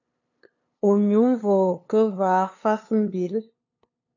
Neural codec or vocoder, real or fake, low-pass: codec, 16 kHz, 2 kbps, FunCodec, trained on LibriTTS, 25 frames a second; fake; 7.2 kHz